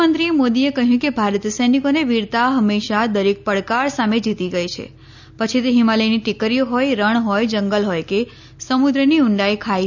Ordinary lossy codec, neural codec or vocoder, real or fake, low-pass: none; none; real; 7.2 kHz